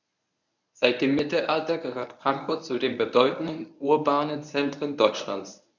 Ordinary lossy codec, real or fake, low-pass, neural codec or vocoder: none; fake; 7.2 kHz; codec, 24 kHz, 0.9 kbps, WavTokenizer, medium speech release version 1